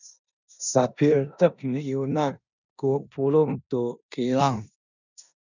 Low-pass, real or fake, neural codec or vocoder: 7.2 kHz; fake; codec, 16 kHz in and 24 kHz out, 0.9 kbps, LongCat-Audio-Codec, four codebook decoder